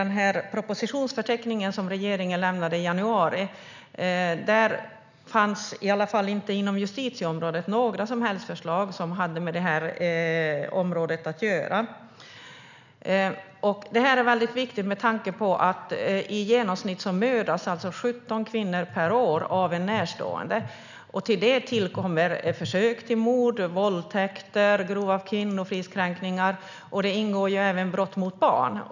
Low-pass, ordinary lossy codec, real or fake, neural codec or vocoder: 7.2 kHz; none; real; none